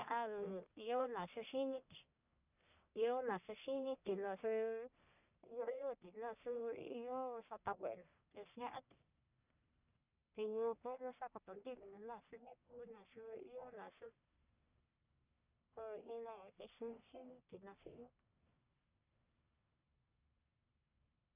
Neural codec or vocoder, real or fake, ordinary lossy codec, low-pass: codec, 44.1 kHz, 1.7 kbps, Pupu-Codec; fake; none; 3.6 kHz